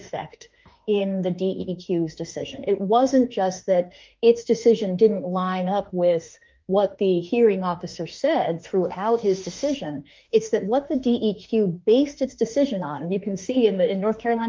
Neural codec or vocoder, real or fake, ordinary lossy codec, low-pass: autoencoder, 48 kHz, 32 numbers a frame, DAC-VAE, trained on Japanese speech; fake; Opus, 24 kbps; 7.2 kHz